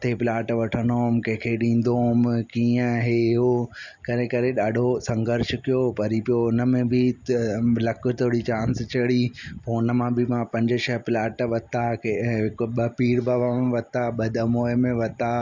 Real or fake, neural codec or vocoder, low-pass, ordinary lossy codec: real; none; 7.2 kHz; none